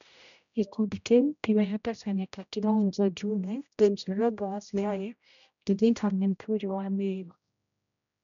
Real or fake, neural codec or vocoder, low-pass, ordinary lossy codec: fake; codec, 16 kHz, 0.5 kbps, X-Codec, HuBERT features, trained on general audio; 7.2 kHz; none